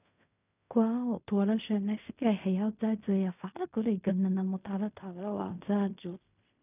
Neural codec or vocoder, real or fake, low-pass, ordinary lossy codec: codec, 16 kHz in and 24 kHz out, 0.4 kbps, LongCat-Audio-Codec, fine tuned four codebook decoder; fake; 3.6 kHz; none